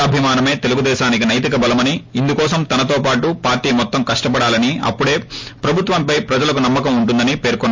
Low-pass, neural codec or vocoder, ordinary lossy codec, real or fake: 7.2 kHz; none; MP3, 48 kbps; real